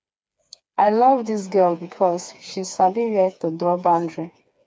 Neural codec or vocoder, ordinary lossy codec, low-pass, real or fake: codec, 16 kHz, 4 kbps, FreqCodec, smaller model; none; none; fake